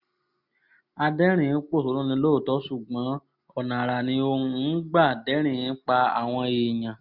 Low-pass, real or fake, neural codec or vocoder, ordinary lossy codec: 5.4 kHz; real; none; none